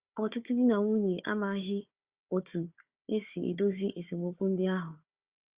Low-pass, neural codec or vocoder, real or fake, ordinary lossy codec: 3.6 kHz; codec, 16 kHz, 4 kbps, FunCodec, trained on Chinese and English, 50 frames a second; fake; Opus, 64 kbps